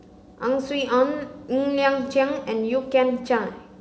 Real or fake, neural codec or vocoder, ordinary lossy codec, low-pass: real; none; none; none